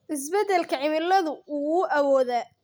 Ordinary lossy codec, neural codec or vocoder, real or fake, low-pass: none; none; real; none